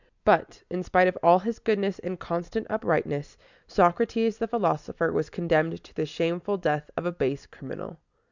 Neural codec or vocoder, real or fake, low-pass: none; real; 7.2 kHz